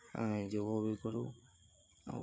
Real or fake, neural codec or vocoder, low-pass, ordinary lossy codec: fake; codec, 16 kHz, 8 kbps, FreqCodec, larger model; none; none